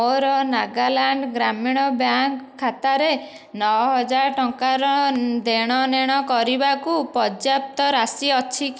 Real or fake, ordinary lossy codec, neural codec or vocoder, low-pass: real; none; none; none